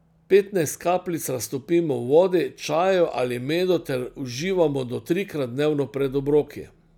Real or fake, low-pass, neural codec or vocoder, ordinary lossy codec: real; 19.8 kHz; none; none